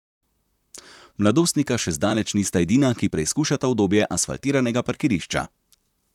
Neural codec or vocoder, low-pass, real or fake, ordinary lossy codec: vocoder, 44.1 kHz, 128 mel bands, Pupu-Vocoder; 19.8 kHz; fake; none